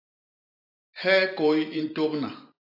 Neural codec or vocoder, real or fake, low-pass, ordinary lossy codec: vocoder, 44.1 kHz, 128 mel bands every 512 samples, BigVGAN v2; fake; 5.4 kHz; AAC, 48 kbps